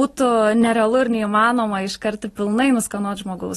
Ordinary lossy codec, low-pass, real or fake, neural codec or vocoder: AAC, 32 kbps; 19.8 kHz; real; none